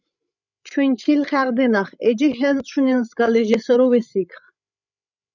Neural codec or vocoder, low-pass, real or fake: codec, 16 kHz, 16 kbps, FreqCodec, larger model; 7.2 kHz; fake